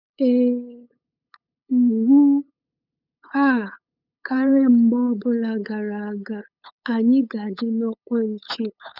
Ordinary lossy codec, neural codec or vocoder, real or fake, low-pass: none; codec, 16 kHz, 8 kbps, FunCodec, trained on LibriTTS, 25 frames a second; fake; 5.4 kHz